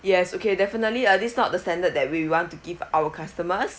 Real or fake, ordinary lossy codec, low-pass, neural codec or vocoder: real; none; none; none